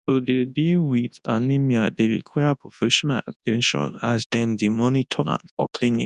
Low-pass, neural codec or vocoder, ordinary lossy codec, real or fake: 10.8 kHz; codec, 24 kHz, 0.9 kbps, WavTokenizer, large speech release; none; fake